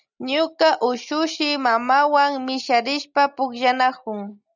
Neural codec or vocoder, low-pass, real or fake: none; 7.2 kHz; real